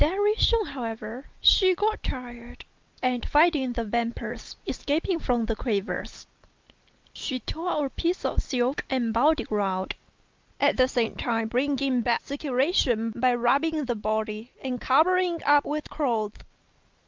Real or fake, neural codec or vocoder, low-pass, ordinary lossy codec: real; none; 7.2 kHz; Opus, 24 kbps